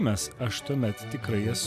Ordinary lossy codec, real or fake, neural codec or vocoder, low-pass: AAC, 96 kbps; real; none; 14.4 kHz